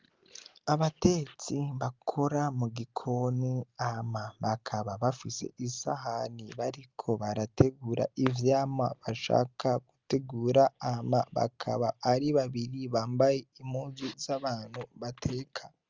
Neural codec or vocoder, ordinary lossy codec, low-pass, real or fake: none; Opus, 32 kbps; 7.2 kHz; real